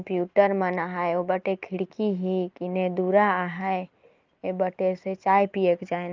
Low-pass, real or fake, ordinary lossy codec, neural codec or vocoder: 7.2 kHz; real; Opus, 32 kbps; none